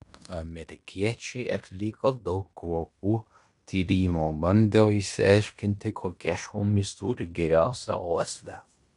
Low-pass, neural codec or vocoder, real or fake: 10.8 kHz; codec, 16 kHz in and 24 kHz out, 0.9 kbps, LongCat-Audio-Codec, fine tuned four codebook decoder; fake